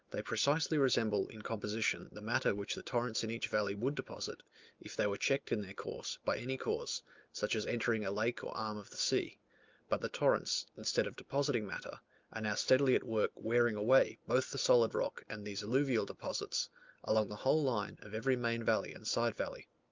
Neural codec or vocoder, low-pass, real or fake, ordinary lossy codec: none; 7.2 kHz; real; Opus, 24 kbps